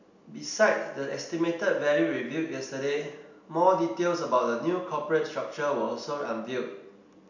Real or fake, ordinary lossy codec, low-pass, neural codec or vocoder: real; none; 7.2 kHz; none